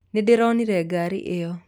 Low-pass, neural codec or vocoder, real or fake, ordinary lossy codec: 19.8 kHz; none; real; none